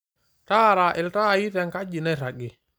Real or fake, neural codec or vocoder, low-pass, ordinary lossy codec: real; none; none; none